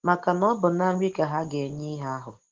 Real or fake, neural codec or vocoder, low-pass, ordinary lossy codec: real; none; 7.2 kHz; Opus, 16 kbps